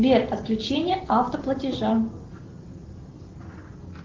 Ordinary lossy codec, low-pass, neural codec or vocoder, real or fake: Opus, 16 kbps; 7.2 kHz; none; real